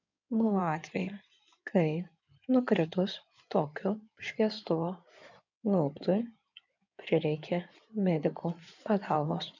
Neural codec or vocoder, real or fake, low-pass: codec, 16 kHz in and 24 kHz out, 2.2 kbps, FireRedTTS-2 codec; fake; 7.2 kHz